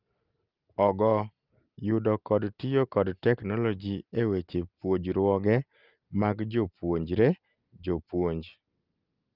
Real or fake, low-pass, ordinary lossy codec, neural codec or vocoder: real; 5.4 kHz; Opus, 32 kbps; none